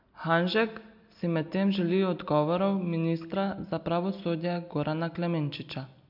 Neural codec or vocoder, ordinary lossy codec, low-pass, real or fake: none; MP3, 48 kbps; 5.4 kHz; real